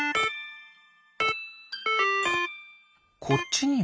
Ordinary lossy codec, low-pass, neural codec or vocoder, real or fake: none; none; none; real